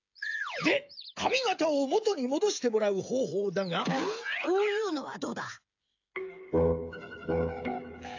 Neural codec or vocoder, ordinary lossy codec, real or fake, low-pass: codec, 16 kHz, 8 kbps, FreqCodec, smaller model; AAC, 48 kbps; fake; 7.2 kHz